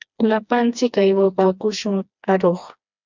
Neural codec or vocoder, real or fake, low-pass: codec, 16 kHz, 2 kbps, FreqCodec, smaller model; fake; 7.2 kHz